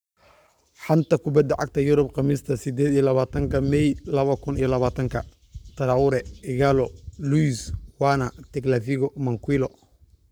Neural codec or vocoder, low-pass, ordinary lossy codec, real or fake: codec, 44.1 kHz, 7.8 kbps, Pupu-Codec; none; none; fake